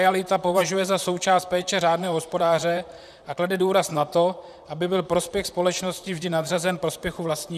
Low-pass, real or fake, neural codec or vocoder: 14.4 kHz; fake; vocoder, 44.1 kHz, 128 mel bands, Pupu-Vocoder